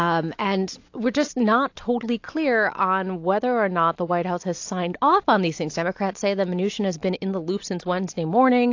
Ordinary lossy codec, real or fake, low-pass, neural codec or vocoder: AAC, 48 kbps; real; 7.2 kHz; none